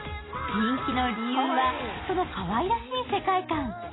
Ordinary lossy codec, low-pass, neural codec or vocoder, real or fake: AAC, 16 kbps; 7.2 kHz; none; real